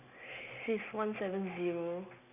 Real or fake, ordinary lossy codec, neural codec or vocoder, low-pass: real; none; none; 3.6 kHz